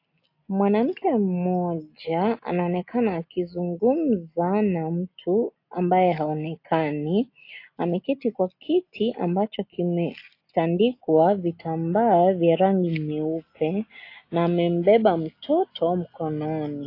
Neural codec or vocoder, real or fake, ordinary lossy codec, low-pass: none; real; AAC, 32 kbps; 5.4 kHz